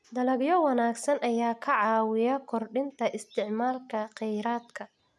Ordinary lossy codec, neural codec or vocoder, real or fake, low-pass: none; none; real; none